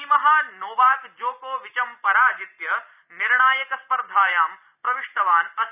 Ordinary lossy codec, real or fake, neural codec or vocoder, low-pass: none; real; none; 3.6 kHz